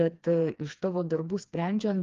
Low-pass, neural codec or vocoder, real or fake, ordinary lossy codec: 7.2 kHz; codec, 16 kHz, 2 kbps, FreqCodec, smaller model; fake; Opus, 32 kbps